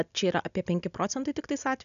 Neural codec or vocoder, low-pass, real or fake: none; 7.2 kHz; real